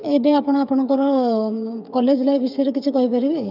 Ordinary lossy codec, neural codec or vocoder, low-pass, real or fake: none; codec, 16 kHz, 8 kbps, FreqCodec, smaller model; 5.4 kHz; fake